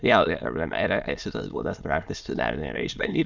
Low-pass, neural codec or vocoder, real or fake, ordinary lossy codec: 7.2 kHz; autoencoder, 22.05 kHz, a latent of 192 numbers a frame, VITS, trained on many speakers; fake; Opus, 64 kbps